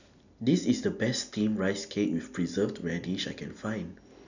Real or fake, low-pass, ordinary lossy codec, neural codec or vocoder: real; 7.2 kHz; none; none